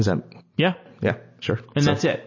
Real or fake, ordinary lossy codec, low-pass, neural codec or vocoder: real; MP3, 32 kbps; 7.2 kHz; none